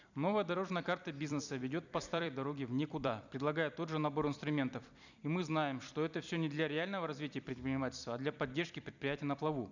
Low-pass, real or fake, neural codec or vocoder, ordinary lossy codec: 7.2 kHz; fake; vocoder, 44.1 kHz, 128 mel bands every 256 samples, BigVGAN v2; none